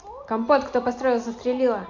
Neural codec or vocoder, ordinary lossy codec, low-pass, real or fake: none; MP3, 48 kbps; 7.2 kHz; real